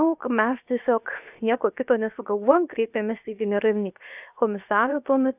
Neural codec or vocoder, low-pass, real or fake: codec, 16 kHz, 0.7 kbps, FocalCodec; 3.6 kHz; fake